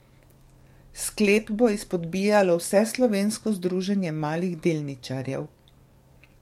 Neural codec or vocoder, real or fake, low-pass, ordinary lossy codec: codec, 44.1 kHz, 7.8 kbps, DAC; fake; 19.8 kHz; MP3, 64 kbps